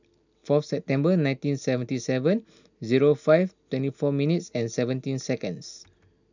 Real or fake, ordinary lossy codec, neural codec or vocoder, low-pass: real; none; none; 7.2 kHz